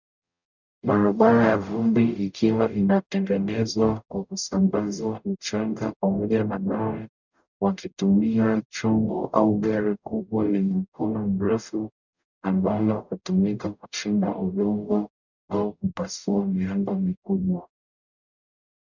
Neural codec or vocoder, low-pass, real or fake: codec, 44.1 kHz, 0.9 kbps, DAC; 7.2 kHz; fake